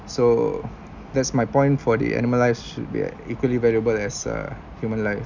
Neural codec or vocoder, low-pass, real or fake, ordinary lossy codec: none; 7.2 kHz; real; none